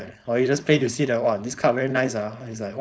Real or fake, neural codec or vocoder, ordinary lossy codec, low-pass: fake; codec, 16 kHz, 4.8 kbps, FACodec; none; none